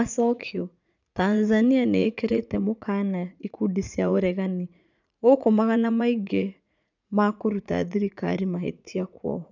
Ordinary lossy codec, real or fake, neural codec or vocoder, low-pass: none; fake; vocoder, 44.1 kHz, 80 mel bands, Vocos; 7.2 kHz